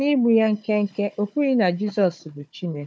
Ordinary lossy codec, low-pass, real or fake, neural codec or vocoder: none; none; fake; codec, 16 kHz, 4 kbps, FunCodec, trained on Chinese and English, 50 frames a second